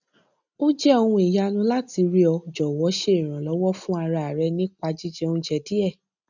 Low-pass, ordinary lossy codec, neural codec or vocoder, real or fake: 7.2 kHz; none; none; real